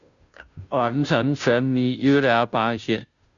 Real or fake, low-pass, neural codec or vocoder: fake; 7.2 kHz; codec, 16 kHz, 0.5 kbps, FunCodec, trained on Chinese and English, 25 frames a second